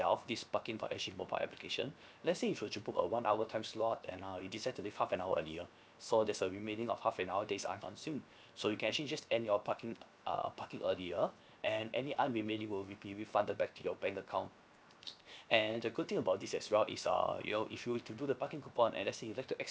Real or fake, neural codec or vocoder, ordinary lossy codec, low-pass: fake; codec, 16 kHz, 0.7 kbps, FocalCodec; none; none